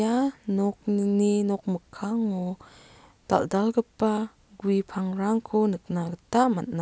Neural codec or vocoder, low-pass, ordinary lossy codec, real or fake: none; none; none; real